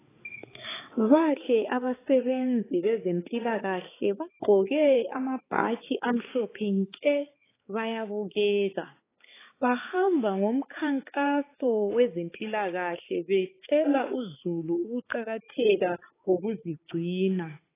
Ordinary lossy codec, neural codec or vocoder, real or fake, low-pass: AAC, 16 kbps; codec, 16 kHz, 4 kbps, X-Codec, HuBERT features, trained on balanced general audio; fake; 3.6 kHz